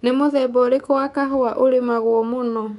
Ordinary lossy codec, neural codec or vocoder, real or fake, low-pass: none; codec, 24 kHz, 3.1 kbps, DualCodec; fake; 10.8 kHz